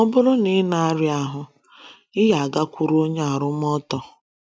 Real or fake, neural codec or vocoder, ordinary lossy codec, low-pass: real; none; none; none